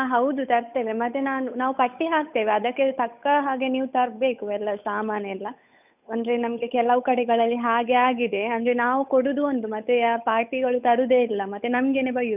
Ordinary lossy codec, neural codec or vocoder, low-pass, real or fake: none; codec, 16 kHz, 8 kbps, FunCodec, trained on Chinese and English, 25 frames a second; 3.6 kHz; fake